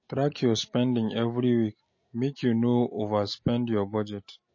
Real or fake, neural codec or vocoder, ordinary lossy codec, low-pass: real; none; MP3, 32 kbps; 7.2 kHz